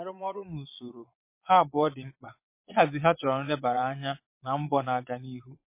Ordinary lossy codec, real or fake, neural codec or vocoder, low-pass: MP3, 24 kbps; fake; vocoder, 22.05 kHz, 80 mel bands, Vocos; 3.6 kHz